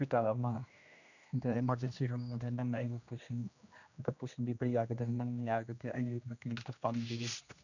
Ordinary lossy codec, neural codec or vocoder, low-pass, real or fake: none; codec, 16 kHz, 1 kbps, X-Codec, HuBERT features, trained on general audio; 7.2 kHz; fake